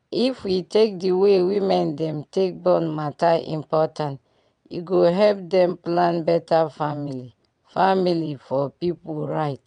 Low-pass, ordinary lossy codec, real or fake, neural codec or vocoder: 9.9 kHz; none; fake; vocoder, 22.05 kHz, 80 mel bands, WaveNeXt